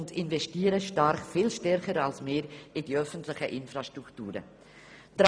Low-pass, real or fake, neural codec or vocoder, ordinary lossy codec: none; real; none; none